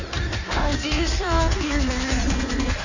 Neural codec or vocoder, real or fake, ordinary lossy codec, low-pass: codec, 16 kHz in and 24 kHz out, 1.1 kbps, FireRedTTS-2 codec; fake; none; 7.2 kHz